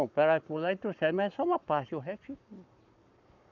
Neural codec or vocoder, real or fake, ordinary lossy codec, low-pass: codec, 44.1 kHz, 7.8 kbps, Pupu-Codec; fake; none; 7.2 kHz